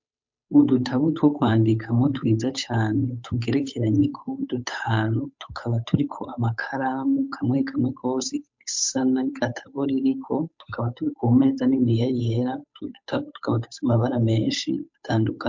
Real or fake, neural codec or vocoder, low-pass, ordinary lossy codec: fake; codec, 16 kHz, 8 kbps, FunCodec, trained on Chinese and English, 25 frames a second; 7.2 kHz; MP3, 48 kbps